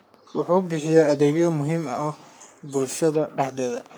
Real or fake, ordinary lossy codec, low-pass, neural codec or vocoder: fake; none; none; codec, 44.1 kHz, 3.4 kbps, Pupu-Codec